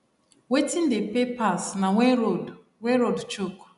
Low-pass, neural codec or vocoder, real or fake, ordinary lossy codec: 10.8 kHz; none; real; none